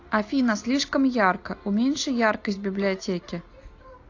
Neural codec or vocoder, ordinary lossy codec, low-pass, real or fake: none; AAC, 48 kbps; 7.2 kHz; real